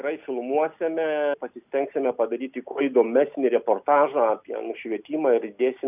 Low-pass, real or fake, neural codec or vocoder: 3.6 kHz; real; none